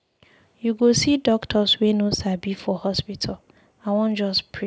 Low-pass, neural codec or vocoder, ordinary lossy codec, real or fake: none; none; none; real